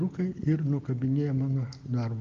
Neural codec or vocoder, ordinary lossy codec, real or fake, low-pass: none; Opus, 24 kbps; real; 7.2 kHz